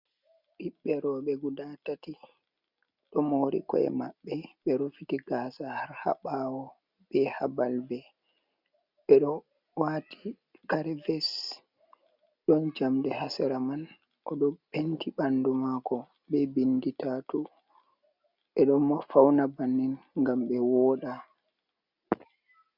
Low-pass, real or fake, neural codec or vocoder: 5.4 kHz; real; none